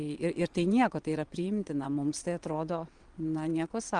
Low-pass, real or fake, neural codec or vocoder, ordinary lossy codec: 9.9 kHz; fake; vocoder, 22.05 kHz, 80 mel bands, WaveNeXt; Opus, 24 kbps